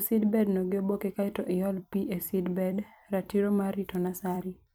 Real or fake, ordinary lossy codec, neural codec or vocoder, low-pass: fake; none; vocoder, 44.1 kHz, 128 mel bands every 256 samples, BigVGAN v2; none